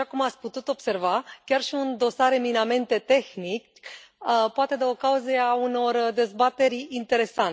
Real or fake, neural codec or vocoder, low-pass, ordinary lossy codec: real; none; none; none